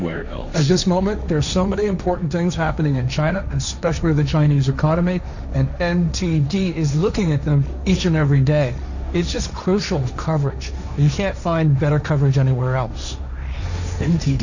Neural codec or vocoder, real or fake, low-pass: codec, 16 kHz, 1.1 kbps, Voila-Tokenizer; fake; 7.2 kHz